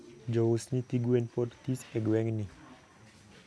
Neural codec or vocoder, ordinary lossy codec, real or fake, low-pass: none; none; real; none